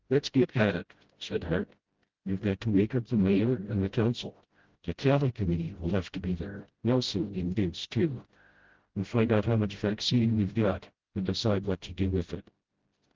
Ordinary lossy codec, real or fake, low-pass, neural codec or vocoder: Opus, 16 kbps; fake; 7.2 kHz; codec, 16 kHz, 0.5 kbps, FreqCodec, smaller model